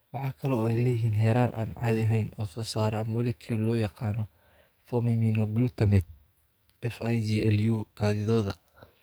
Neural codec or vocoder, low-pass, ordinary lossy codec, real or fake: codec, 44.1 kHz, 2.6 kbps, SNAC; none; none; fake